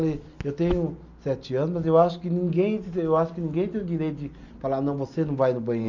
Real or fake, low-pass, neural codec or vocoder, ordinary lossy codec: real; 7.2 kHz; none; none